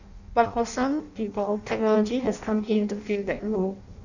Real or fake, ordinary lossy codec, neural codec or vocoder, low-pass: fake; none; codec, 16 kHz in and 24 kHz out, 0.6 kbps, FireRedTTS-2 codec; 7.2 kHz